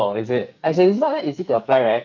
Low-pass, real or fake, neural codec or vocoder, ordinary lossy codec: 7.2 kHz; fake; codec, 44.1 kHz, 2.6 kbps, SNAC; MP3, 64 kbps